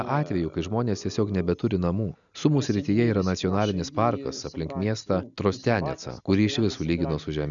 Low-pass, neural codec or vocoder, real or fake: 7.2 kHz; none; real